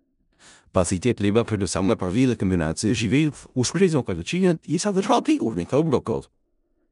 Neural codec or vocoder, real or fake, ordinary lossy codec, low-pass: codec, 16 kHz in and 24 kHz out, 0.4 kbps, LongCat-Audio-Codec, four codebook decoder; fake; none; 10.8 kHz